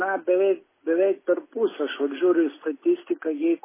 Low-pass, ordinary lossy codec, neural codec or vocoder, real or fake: 3.6 kHz; MP3, 16 kbps; none; real